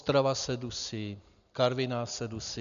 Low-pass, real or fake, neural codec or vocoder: 7.2 kHz; real; none